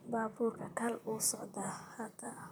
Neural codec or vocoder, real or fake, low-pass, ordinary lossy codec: vocoder, 44.1 kHz, 128 mel bands, Pupu-Vocoder; fake; none; none